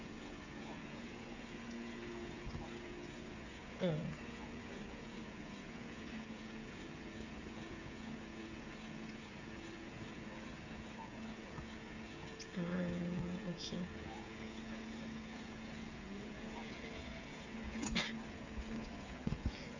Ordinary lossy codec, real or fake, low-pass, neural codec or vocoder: AAC, 48 kbps; fake; 7.2 kHz; codec, 16 kHz, 16 kbps, FreqCodec, smaller model